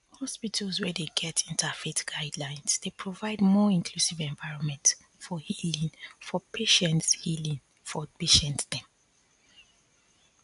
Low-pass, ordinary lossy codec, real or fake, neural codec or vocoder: 10.8 kHz; AAC, 96 kbps; real; none